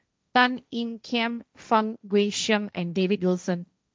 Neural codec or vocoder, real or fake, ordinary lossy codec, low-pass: codec, 16 kHz, 1.1 kbps, Voila-Tokenizer; fake; none; none